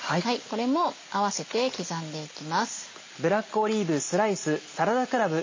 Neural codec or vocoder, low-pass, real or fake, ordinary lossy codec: none; 7.2 kHz; real; MP3, 32 kbps